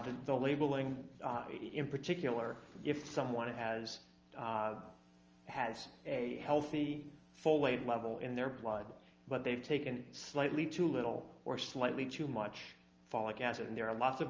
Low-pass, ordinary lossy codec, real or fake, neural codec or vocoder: 7.2 kHz; Opus, 24 kbps; real; none